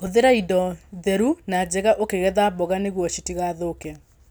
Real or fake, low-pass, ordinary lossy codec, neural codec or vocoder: real; none; none; none